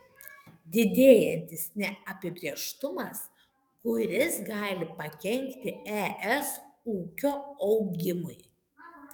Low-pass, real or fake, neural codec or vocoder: 19.8 kHz; fake; codec, 44.1 kHz, 7.8 kbps, DAC